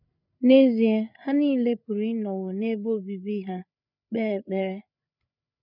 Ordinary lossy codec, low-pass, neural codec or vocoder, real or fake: none; 5.4 kHz; codec, 16 kHz, 8 kbps, FreqCodec, larger model; fake